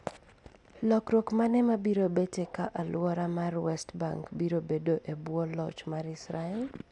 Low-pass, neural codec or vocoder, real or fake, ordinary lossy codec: 10.8 kHz; none; real; none